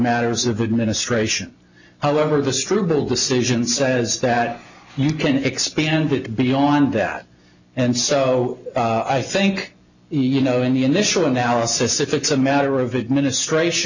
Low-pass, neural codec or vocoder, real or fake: 7.2 kHz; none; real